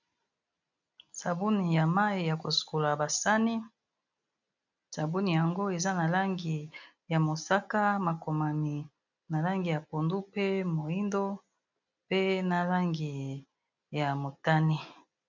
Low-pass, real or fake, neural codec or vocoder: 7.2 kHz; real; none